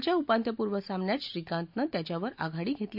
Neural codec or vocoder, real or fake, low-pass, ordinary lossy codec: none; real; 5.4 kHz; AAC, 48 kbps